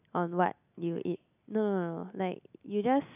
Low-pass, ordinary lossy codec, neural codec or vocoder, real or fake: 3.6 kHz; none; none; real